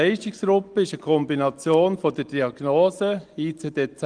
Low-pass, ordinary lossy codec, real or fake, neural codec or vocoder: 9.9 kHz; Opus, 24 kbps; real; none